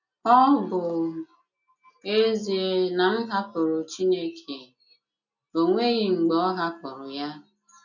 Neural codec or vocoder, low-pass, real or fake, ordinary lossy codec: none; 7.2 kHz; real; none